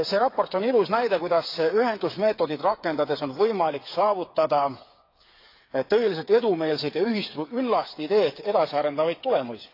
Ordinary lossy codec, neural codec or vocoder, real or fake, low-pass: AAC, 32 kbps; codec, 16 kHz, 8 kbps, FreqCodec, smaller model; fake; 5.4 kHz